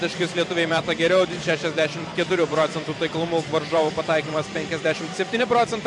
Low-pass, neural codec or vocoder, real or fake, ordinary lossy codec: 10.8 kHz; vocoder, 44.1 kHz, 128 mel bands every 256 samples, BigVGAN v2; fake; MP3, 96 kbps